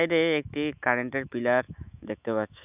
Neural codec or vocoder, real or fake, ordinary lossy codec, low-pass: autoencoder, 48 kHz, 128 numbers a frame, DAC-VAE, trained on Japanese speech; fake; none; 3.6 kHz